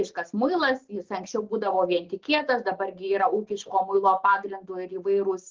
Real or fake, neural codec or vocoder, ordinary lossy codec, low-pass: real; none; Opus, 16 kbps; 7.2 kHz